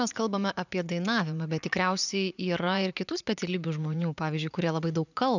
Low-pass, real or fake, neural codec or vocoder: 7.2 kHz; fake; vocoder, 44.1 kHz, 80 mel bands, Vocos